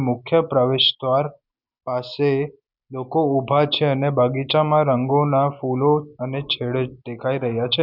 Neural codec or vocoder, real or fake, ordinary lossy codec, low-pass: none; real; none; 5.4 kHz